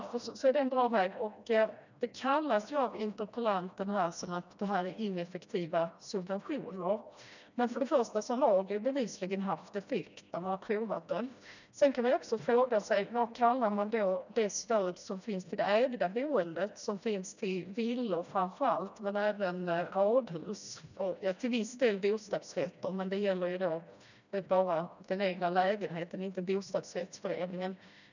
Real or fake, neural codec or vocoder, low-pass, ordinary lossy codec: fake; codec, 16 kHz, 1 kbps, FreqCodec, smaller model; 7.2 kHz; none